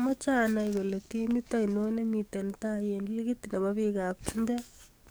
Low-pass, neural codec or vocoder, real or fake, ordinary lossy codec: none; codec, 44.1 kHz, 7.8 kbps, DAC; fake; none